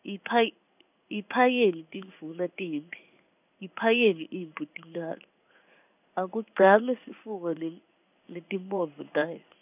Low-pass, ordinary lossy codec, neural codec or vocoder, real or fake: 3.6 kHz; none; codec, 16 kHz in and 24 kHz out, 1 kbps, XY-Tokenizer; fake